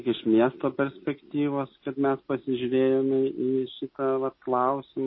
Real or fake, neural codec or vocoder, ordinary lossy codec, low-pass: real; none; MP3, 24 kbps; 7.2 kHz